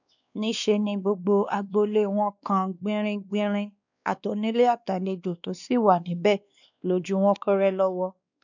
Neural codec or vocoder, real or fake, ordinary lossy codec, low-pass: codec, 16 kHz, 2 kbps, X-Codec, WavLM features, trained on Multilingual LibriSpeech; fake; none; 7.2 kHz